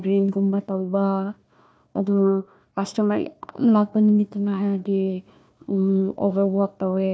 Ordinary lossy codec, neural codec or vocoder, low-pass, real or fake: none; codec, 16 kHz, 1 kbps, FunCodec, trained on Chinese and English, 50 frames a second; none; fake